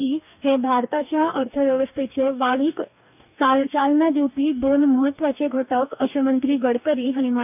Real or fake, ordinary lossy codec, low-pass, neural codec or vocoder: fake; none; 3.6 kHz; codec, 44.1 kHz, 2.6 kbps, DAC